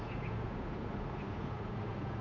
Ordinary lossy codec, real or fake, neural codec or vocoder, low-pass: none; fake; codec, 16 kHz, 8 kbps, FunCodec, trained on Chinese and English, 25 frames a second; 7.2 kHz